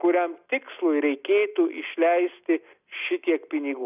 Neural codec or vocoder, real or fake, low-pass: none; real; 3.6 kHz